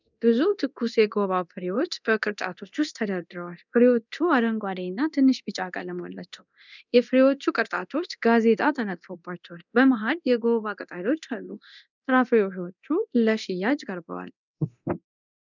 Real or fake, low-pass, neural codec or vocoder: fake; 7.2 kHz; codec, 24 kHz, 0.9 kbps, DualCodec